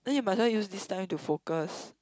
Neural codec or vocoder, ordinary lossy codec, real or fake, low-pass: none; none; real; none